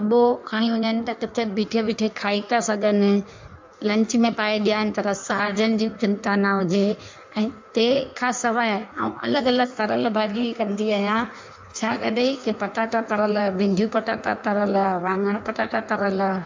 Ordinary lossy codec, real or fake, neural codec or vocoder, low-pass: MP3, 64 kbps; fake; codec, 16 kHz in and 24 kHz out, 1.1 kbps, FireRedTTS-2 codec; 7.2 kHz